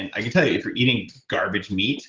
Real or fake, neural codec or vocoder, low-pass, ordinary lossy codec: real; none; 7.2 kHz; Opus, 16 kbps